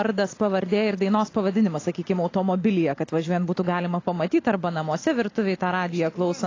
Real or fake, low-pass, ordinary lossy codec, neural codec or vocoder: real; 7.2 kHz; AAC, 32 kbps; none